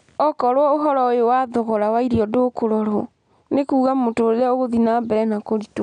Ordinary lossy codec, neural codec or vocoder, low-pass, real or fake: none; none; 9.9 kHz; real